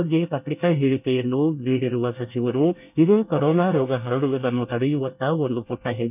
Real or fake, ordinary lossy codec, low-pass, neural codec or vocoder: fake; none; 3.6 kHz; codec, 24 kHz, 1 kbps, SNAC